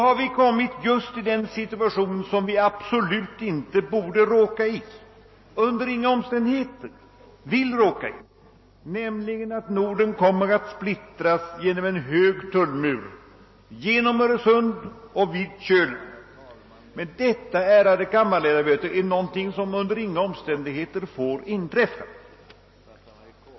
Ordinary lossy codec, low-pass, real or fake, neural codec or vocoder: MP3, 24 kbps; 7.2 kHz; real; none